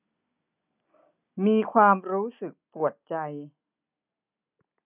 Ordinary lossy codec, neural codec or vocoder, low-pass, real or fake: none; none; 3.6 kHz; real